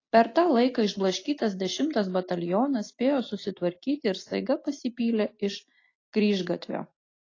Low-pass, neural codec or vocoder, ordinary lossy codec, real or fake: 7.2 kHz; none; AAC, 32 kbps; real